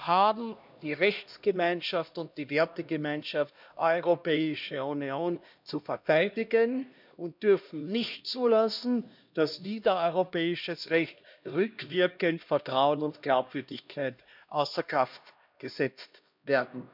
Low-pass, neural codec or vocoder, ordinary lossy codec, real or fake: 5.4 kHz; codec, 16 kHz, 1 kbps, X-Codec, HuBERT features, trained on LibriSpeech; none; fake